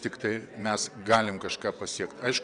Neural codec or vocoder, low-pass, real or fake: vocoder, 22.05 kHz, 80 mel bands, WaveNeXt; 9.9 kHz; fake